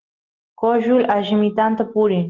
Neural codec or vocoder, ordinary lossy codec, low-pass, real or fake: none; Opus, 16 kbps; 7.2 kHz; real